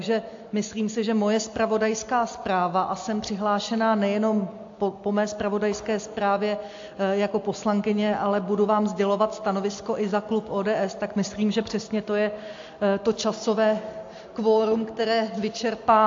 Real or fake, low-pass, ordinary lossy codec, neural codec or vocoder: real; 7.2 kHz; AAC, 48 kbps; none